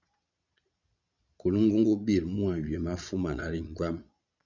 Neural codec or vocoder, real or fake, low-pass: vocoder, 22.05 kHz, 80 mel bands, Vocos; fake; 7.2 kHz